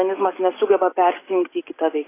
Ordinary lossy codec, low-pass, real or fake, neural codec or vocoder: AAC, 16 kbps; 3.6 kHz; real; none